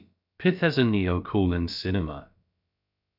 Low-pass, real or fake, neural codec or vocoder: 5.4 kHz; fake; codec, 16 kHz, about 1 kbps, DyCAST, with the encoder's durations